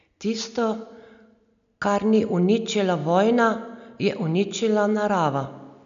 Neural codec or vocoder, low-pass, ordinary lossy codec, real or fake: none; 7.2 kHz; none; real